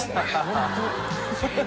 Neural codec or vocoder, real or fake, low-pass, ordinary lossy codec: none; real; none; none